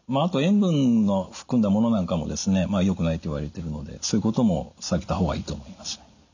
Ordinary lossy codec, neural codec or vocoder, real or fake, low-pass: none; none; real; 7.2 kHz